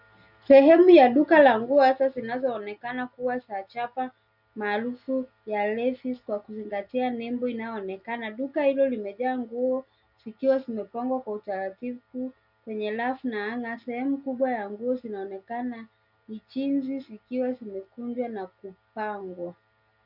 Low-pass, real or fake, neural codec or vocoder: 5.4 kHz; real; none